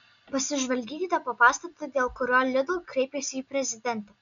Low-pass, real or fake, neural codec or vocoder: 7.2 kHz; real; none